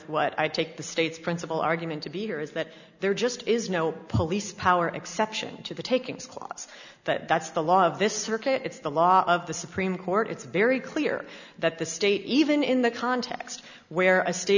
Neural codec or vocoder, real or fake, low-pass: none; real; 7.2 kHz